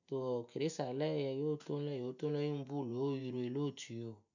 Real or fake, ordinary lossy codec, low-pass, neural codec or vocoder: real; none; 7.2 kHz; none